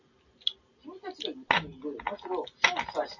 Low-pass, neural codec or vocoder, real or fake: 7.2 kHz; none; real